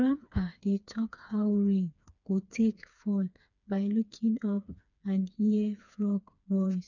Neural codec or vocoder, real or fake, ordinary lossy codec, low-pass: codec, 16 kHz, 4 kbps, FreqCodec, smaller model; fake; none; 7.2 kHz